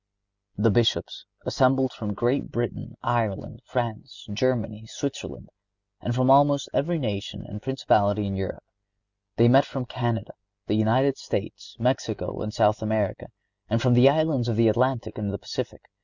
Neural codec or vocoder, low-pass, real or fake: none; 7.2 kHz; real